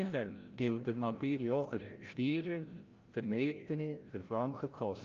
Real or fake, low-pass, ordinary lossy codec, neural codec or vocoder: fake; 7.2 kHz; Opus, 24 kbps; codec, 16 kHz, 0.5 kbps, FreqCodec, larger model